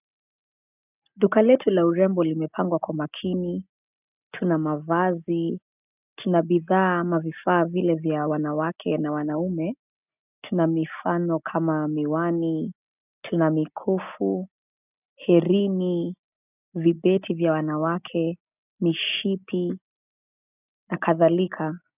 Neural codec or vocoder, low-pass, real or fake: none; 3.6 kHz; real